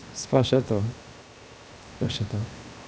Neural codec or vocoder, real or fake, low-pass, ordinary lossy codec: codec, 16 kHz, 0.7 kbps, FocalCodec; fake; none; none